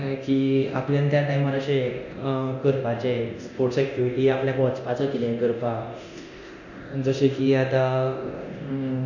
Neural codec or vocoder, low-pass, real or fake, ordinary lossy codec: codec, 24 kHz, 0.9 kbps, DualCodec; 7.2 kHz; fake; none